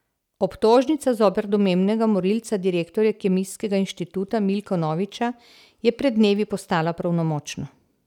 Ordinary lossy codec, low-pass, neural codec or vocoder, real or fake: none; 19.8 kHz; none; real